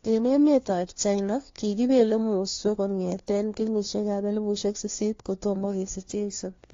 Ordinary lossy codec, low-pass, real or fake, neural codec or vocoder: AAC, 32 kbps; 7.2 kHz; fake; codec, 16 kHz, 1 kbps, FunCodec, trained on LibriTTS, 50 frames a second